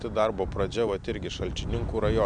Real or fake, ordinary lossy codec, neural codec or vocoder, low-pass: real; MP3, 96 kbps; none; 9.9 kHz